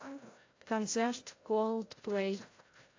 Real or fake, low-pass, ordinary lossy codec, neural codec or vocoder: fake; 7.2 kHz; AAC, 32 kbps; codec, 16 kHz, 0.5 kbps, FreqCodec, larger model